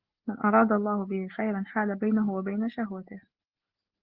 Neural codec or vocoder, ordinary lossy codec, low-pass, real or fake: none; Opus, 16 kbps; 5.4 kHz; real